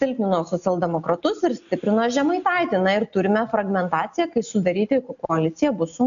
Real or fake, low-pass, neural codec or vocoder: real; 7.2 kHz; none